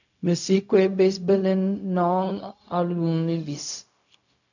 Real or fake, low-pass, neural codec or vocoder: fake; 7.2 kHz; codec, 16 kHz, 0.4 kbps, LongCat-Audio-Codec